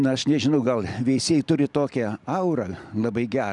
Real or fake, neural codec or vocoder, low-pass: real; none; 10.8 kHz